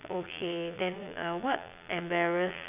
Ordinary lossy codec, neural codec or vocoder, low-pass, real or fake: none; vocoder, 22.05 kHz, 80 mel bands, Vocos; 3.6 kHz; fake